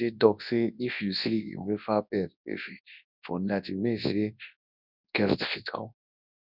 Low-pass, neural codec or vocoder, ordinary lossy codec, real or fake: 5.4 kHz; codec, 24 kHz, 0.9 kbps, WavTokenizer, large speech release; none; fake